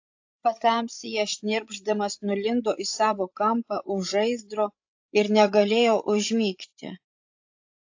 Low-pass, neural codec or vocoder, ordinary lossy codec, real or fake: 7.2 kHz; codec, 16 kHz, 16 kbps, FreqCodec, larger model; AAC, 48 kbps; fake